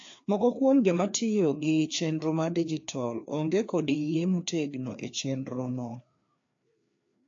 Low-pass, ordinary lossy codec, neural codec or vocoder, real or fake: 7.2 kHz; AAC, 48 kbps; codec, 16 kHz, 2 kbps, FreqCodec, larger model; fake